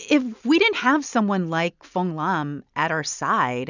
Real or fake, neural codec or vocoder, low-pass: real; none; 7.2 kHz